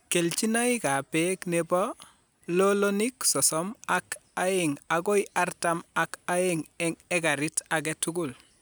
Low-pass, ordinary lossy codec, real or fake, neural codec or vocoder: none; none; real; none